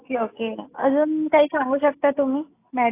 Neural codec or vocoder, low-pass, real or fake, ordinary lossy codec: none; 3.6 kHz; real; AAC, 24 kbps